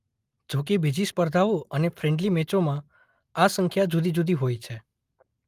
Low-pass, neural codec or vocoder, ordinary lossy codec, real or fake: 14.4 kHz; none; Opus, 32 kbps; real